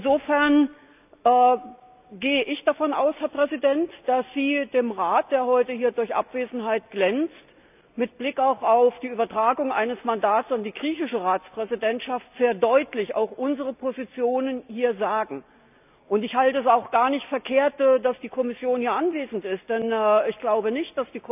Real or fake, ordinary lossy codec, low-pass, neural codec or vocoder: real; none; 3.6 kHz; none